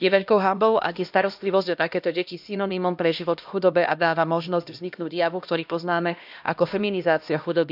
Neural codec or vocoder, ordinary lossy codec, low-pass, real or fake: codec, 16 kHz, 1 kbps, X-Codec, HuBERT features, trained on LibriSpeech; none; 5.4 kHz; fake